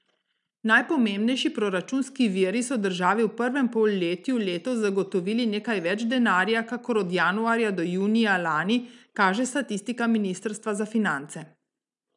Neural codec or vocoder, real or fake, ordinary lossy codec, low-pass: none; real; none; 10.8 kHz